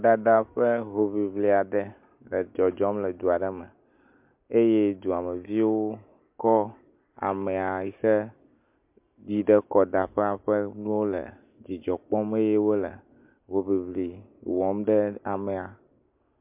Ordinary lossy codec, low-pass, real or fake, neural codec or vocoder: MP3, 32 kbps; 3.6 kHz; real; none